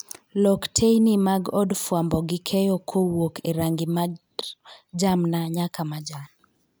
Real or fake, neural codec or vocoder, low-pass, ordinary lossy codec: real; none; none; none